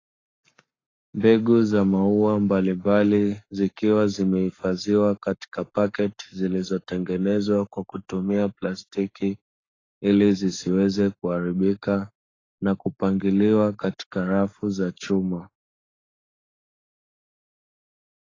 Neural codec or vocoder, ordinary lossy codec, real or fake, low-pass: codec, 44.1 kHz, 7.8 kbps, Pupu-Codec; AAC, 32 kbps; fake; 7.2 kHz